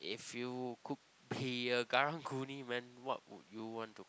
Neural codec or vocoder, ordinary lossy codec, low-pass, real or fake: none; none; none; real